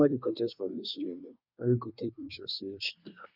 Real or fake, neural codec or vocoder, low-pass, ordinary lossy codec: fake; codec, 24 kHz, 1 kbps, SNAC; 5.4 kHz; none